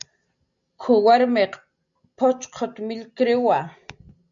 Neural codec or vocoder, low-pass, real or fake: none; 7.2 kHz; real